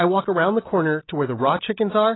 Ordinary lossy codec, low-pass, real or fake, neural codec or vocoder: AAC, 16 kbps; 7.2 kHz; real; none